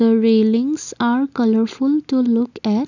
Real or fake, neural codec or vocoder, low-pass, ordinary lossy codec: real; none; 7.2 kHz; none